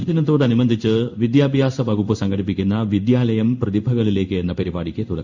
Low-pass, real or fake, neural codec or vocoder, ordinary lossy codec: 7.2 kHz; fake; codec, 16 kHz in and 24 kHz out, 1 kbps, XY-Tokenizer; none